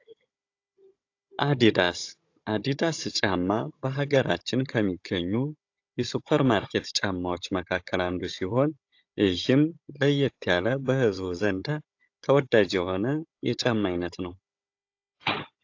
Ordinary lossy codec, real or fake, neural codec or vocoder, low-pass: AAC, 48 kbps; fake; codec, 16 kHz, 16 kbps, FunCodec, trained on Chinese and English, 50 frames a second; 7.2 kHz